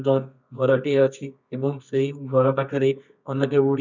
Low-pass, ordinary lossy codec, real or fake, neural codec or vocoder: 7.2 kHz; none; fake; codec, 24 kHz, 0.9 kbps, WavTokenizer, medium music audio release